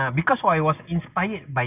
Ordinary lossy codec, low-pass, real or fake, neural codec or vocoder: none; 3.6 kHz; real; none